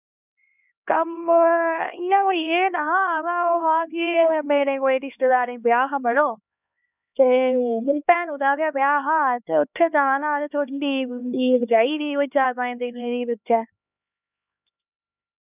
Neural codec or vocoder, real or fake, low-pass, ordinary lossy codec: codec, 16 kHz, 2 kbps, X-Codec, HuBERT features, trained on LibriSpeech; fake; 3.6 kHz; none